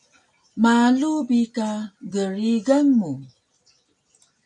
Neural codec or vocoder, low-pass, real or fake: none; 10.8 kHz; real